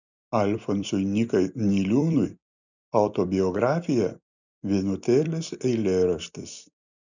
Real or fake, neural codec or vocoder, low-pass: fake; vocoder, 44.1 kHz, 128 mel bands every 256 samples, BigVGAN v2; 7.2 kHz